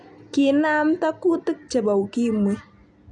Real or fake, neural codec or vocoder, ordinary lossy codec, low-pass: real; none; none; 9.9 kHz